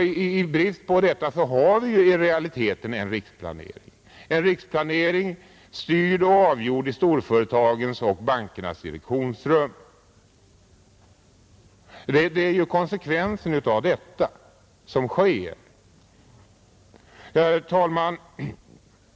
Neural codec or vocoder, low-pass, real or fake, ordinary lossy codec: none; none; real; none